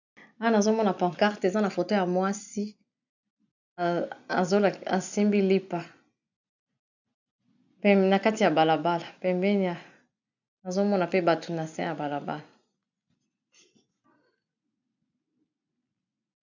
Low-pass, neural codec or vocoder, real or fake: 7.2 kHz; none; real